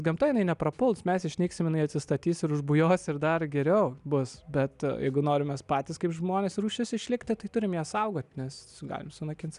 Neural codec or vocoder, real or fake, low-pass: none; real; 10.8 kHz